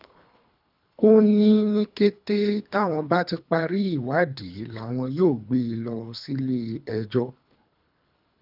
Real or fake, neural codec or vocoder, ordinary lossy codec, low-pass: fake; codec, 24 kHz, 3 kbps, HILCodec; none; 5.4 kHz